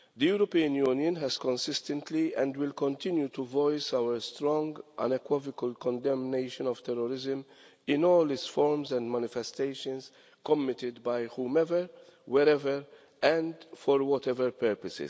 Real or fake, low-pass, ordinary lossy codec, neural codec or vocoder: real; none; none; none